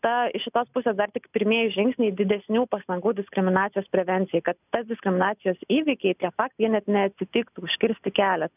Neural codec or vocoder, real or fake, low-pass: none; real; 3.6 kHz